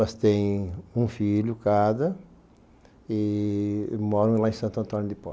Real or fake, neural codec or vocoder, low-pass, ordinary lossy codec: real; none; none; none